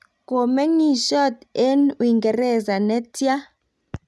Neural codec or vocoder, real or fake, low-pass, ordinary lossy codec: none; real; none; none